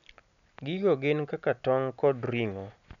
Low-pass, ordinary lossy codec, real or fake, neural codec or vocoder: 7.2 kHz; none; real; none